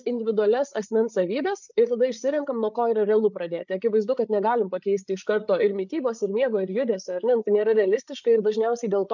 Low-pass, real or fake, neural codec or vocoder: 7.2 kHz; fake; codec, 16 kHz, 8 kbps, FreqCodec, larger model